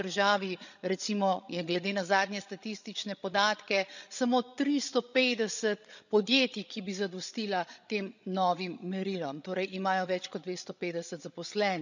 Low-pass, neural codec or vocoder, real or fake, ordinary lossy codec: 7.2 kHz; codec, 16 kHz, 16 kbps, FreqCodec, larger model; fake; none